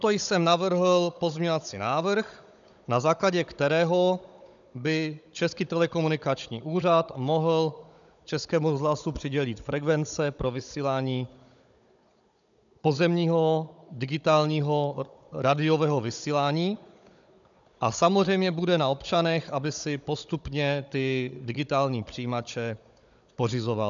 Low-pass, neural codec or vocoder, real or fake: 7.2 kHz; codec, 16 kHz, 16 kbps, FunCodec, trained on Chinese and English, 50 frames a second; fake